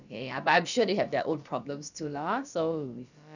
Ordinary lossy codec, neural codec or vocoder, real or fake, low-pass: none; codec, 16 kHz, about 1 kbps, DyCAST, with the encoder's durations; fake; 7.2 kHz